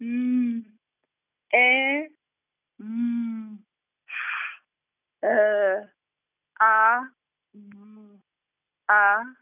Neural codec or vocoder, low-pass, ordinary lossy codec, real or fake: none; 3.6 kHz; none; real